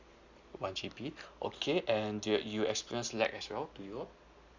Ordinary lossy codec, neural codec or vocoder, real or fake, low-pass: Opus, 64 kbps; none; real; 7.2 kHz